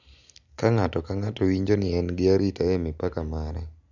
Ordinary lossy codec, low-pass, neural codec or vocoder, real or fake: none; 7.2 kHz; none; real